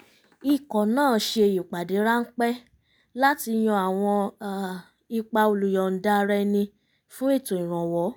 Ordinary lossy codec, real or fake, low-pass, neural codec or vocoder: none; real; none; none